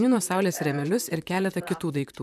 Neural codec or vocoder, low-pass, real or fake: vocoder, 44.1 kHz, 128 mel bands, Pupu-Vocoder; 14.4 kHz; fake